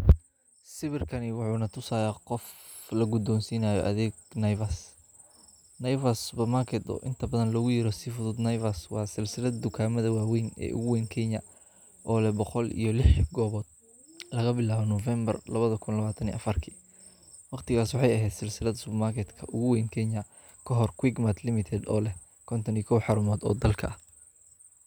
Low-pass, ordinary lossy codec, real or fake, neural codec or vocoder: none; none; real; none